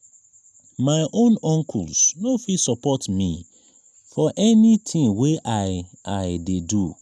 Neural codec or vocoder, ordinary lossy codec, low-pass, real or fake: none; none; none; real